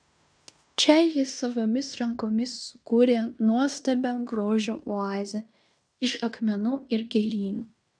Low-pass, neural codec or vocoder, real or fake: 9.9 kHz; codec, 16 kHz in and 24 kHz out, 0.9 kbps, LongCat-Audio-Codec, fine tuned four codebook decoder; fake